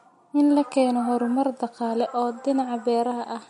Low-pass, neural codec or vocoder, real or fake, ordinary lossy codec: 19.8 kHz; none; real; MP3, 48 kbps